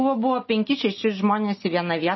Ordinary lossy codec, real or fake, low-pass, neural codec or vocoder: MP3, 24 kbps; real; 7.2 kHz; none